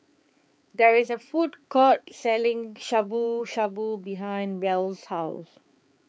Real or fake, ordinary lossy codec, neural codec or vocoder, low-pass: fake; none; codec, 16 kHz, 4 kbps, X-Codec, HuBERT features, trained on balanced general audio; none